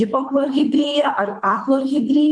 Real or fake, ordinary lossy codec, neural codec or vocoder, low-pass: fake; MP3, 64 kbps; codec, 24 kHz, 3 kbps, HILCodec; 9.9 kHz